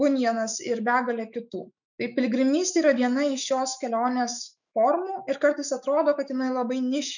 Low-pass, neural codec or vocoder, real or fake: 7.2 kHz; autoencoder, 48 kHz, 128 numbers a frame, DAC-VAE, trained on Japanese speech; fake